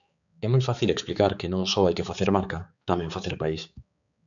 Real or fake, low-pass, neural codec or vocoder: fake; 7.2 kHz; codec, 16 kHz, 4 kbps, X-Codec, HuBERT features, trained on balanced general audio